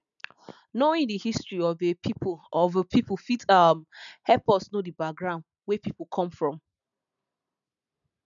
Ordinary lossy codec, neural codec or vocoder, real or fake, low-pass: none; none; real; 7.2 kHz